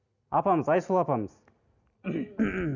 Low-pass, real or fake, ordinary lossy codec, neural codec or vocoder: 7.2 kHz; real; none; none